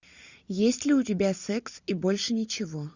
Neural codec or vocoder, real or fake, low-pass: none; real; 7.2 kHz